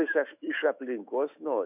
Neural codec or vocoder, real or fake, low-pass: autoencoder, 48 kHz, 128 numbers a frame, DAC-VAE, trained on Japanese speech; fake; 3.6 kHz